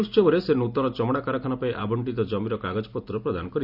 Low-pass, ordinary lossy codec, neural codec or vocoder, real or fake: 5.4 kHz; none; none; real